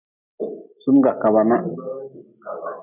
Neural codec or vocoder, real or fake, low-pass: none; real; 3.6 kHz